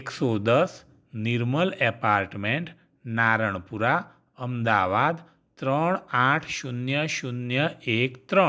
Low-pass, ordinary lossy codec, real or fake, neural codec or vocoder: none; none; real; none